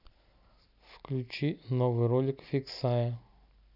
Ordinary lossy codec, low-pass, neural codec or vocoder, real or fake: none; 5.4 kHz; none; real